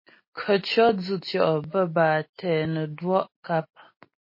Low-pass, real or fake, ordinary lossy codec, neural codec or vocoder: 5.4 kHz; real; MP3, 24 kbps; none